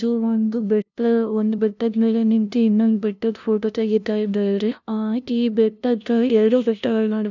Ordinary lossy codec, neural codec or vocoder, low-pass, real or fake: none; codec, 16 kHz, 0.5 kbps, FunCodec, trained on LibriTTS, 25 frames a second; 7.2 kHz; fake